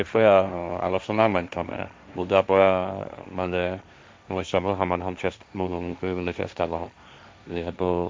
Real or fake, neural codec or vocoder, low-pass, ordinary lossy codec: fake; codec, 16 kHz, 1.1 kbps, Voila-Tokenizer; none; none